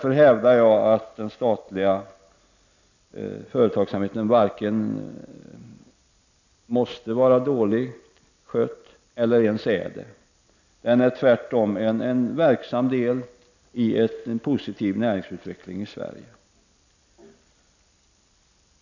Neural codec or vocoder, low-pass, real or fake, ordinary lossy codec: none; 7.2 kHz; real; none